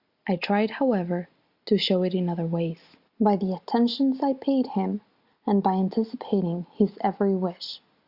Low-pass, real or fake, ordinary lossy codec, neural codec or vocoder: 5.4 kHz; real; Opus, 64 kbps; none